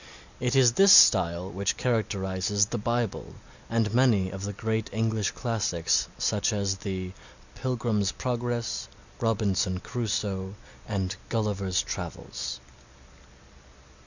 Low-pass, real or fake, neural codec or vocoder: 7.2 kHz; real; none